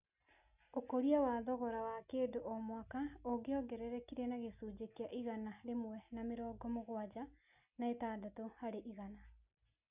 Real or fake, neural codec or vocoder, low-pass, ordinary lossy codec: real; none; 3.6 kHz; none